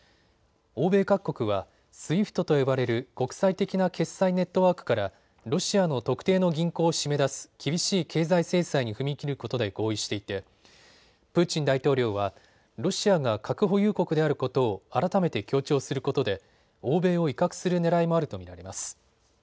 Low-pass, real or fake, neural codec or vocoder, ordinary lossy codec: none; real; none; none